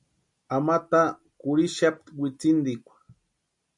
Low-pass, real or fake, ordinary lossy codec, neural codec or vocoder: 10.8 kHz; real; MP3, 96 kbps; none